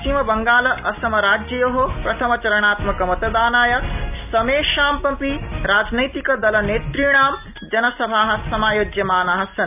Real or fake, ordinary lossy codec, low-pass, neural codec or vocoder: real; none; 3.6 kHz; none